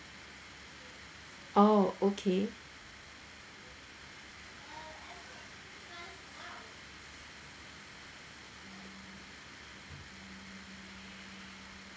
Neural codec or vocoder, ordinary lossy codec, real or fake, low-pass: none; none; real; none